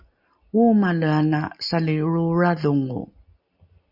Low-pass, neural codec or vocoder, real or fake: 5.4 kHz; none; real